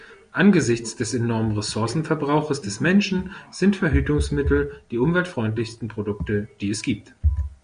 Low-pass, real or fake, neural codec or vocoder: 9.9 kHz; real; none